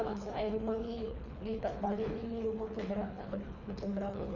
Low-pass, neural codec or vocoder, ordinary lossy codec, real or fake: 7.2 kHz; codec, 24 kHz, 3 kbps, HILCodec; none; fake